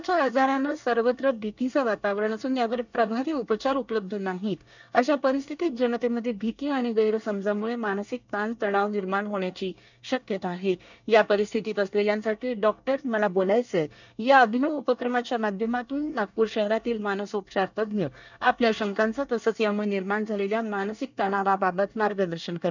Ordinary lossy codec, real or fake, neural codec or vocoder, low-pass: none; fake; codec, 24 kHz, 1 kbps, SNAC; 7.2 kHz